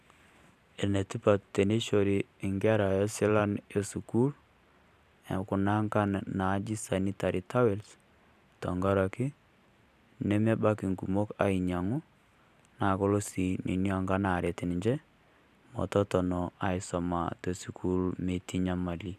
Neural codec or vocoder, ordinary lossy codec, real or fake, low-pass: vocoder, 44.1 kHz, 128 mel bands every 512 samples, BigVGAN v2; none; fake; 14.4 kHz